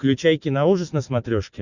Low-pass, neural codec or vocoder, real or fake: 7.2 kHz; none; real